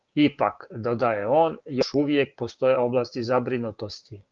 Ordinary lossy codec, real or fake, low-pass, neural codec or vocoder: Opus, 32 kbps; fake; 7.2 kHz; codec, 16 kHz, 6 kbps, DAC